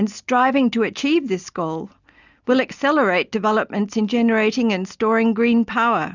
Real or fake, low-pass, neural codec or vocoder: real; 7.2 kHz; none